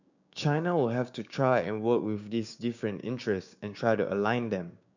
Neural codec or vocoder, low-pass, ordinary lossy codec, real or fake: codec, 16 kHz, 6 kbps, DAC; 7.2 kHz; none; fake